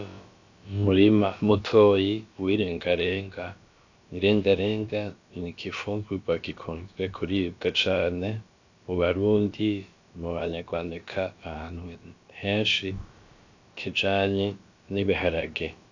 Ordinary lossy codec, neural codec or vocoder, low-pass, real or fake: AAC, 48 kbps; codec, 16 kHz, about 1 kbps, DyCAST, with the encoder's durations; 7.2 kHz; fake